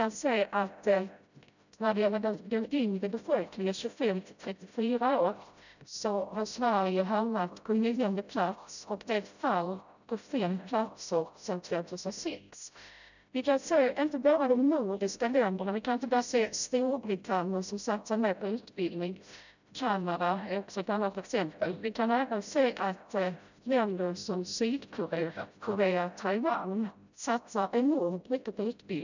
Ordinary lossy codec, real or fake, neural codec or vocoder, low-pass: none; fake; codec, 16 kHz, 0.5 kbps, FreqCodec, smaller model; 7.2 kHz